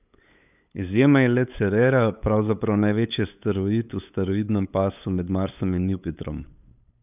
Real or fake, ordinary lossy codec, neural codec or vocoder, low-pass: fake; none; codec, 16 kHz, 8 kbps, FunCodec, trained on LibriTTS, 25 frames a second; 3.6 kHz